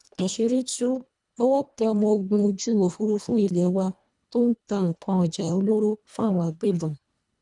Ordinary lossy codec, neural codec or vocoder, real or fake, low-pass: none; codec, 24 kHz, 1.5 kbps, HILCodec; fake; 10.8 kHz